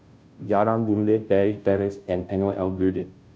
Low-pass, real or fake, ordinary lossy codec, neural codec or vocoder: none; fake; none; codec, 16 kHz, 0.5 kbps, FunCodec, trained on Chinese and English, 25 frames a second